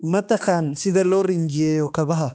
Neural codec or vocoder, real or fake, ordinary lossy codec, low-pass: codec, 16 kHz, 2 kbps, X-Codec, HuBERT features, trained on balanced general audio; fake; none; none